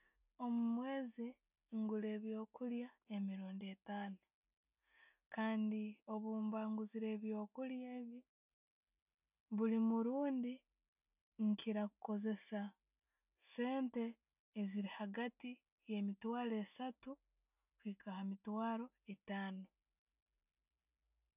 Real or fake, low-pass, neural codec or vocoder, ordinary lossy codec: real; 3.6 kHz; none; none